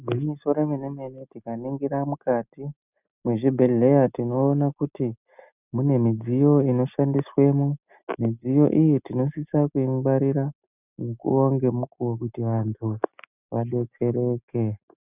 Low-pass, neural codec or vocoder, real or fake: 3.6 kHz; none; real